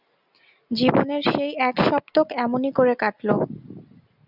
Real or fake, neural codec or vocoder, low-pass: real; none; 5.4 kHz